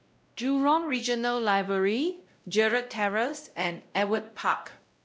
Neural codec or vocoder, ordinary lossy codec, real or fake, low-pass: codec, 16 kHz, 0.5 kbps, X-Codec, WavLM features, trained on Multilingual LibriSpeech; none; fake; none